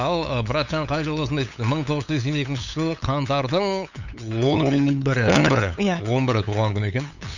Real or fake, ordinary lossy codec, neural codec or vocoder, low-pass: fake; none; codec, 16 kHz, 8 kbps, FunCodec, trained on LibriTTS, 25 frames a second; 7.2 kHz